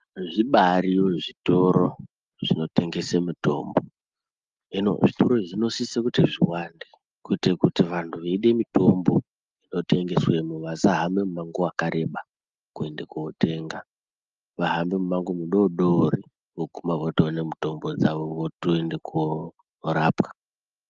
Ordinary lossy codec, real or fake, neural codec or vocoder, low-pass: Opus, 32 kbps; real; none; 7.2 kHz